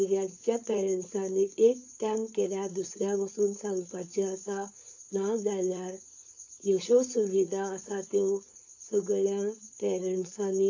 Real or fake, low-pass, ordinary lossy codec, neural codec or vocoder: fake; 7.2 kHz; AAC, 48 kbps; codec, 16 kHz, 4.8 kbps, FACodec